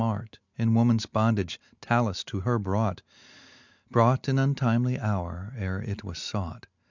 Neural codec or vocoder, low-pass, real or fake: none; 7.2 kHz; real